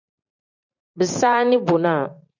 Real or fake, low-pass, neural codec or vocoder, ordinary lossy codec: fake; 7.2 kHz; vocoder, 44.1 kHz, 128 mel bands every 512 samples, BigVGAN v2; AAC, 48 kbps